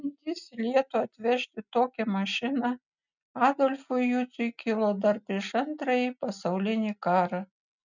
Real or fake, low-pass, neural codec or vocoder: real; 7.2 kHz; none